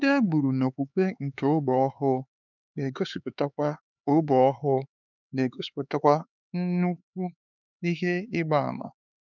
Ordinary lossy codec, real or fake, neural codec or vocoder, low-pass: none; fake; codec, 16 kHz, 4 kbps, X-Codec, HuBERT features, trained on LibriSpeech; 7.2 kHz